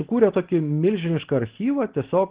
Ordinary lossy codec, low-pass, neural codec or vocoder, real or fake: Opus, 16 kbps; 3.6 kHz; none; real